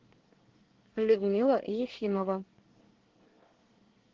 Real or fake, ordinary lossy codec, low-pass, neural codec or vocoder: fake; Opus, 16 kbps; 7.2 kHz; codec, 24 kHz, 1 kbps, SNAC